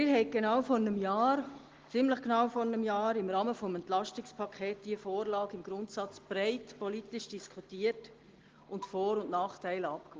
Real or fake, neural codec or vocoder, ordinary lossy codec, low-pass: real; none; Opus, 16 kbps; 7.2 kHz